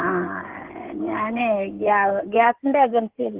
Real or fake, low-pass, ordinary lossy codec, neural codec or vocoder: fake; 3.6 kHz; Opus, 16 kbps; codec, 16 kHz in and 24 kHz out, 2.2 kbps, FireRedTTS-2 codec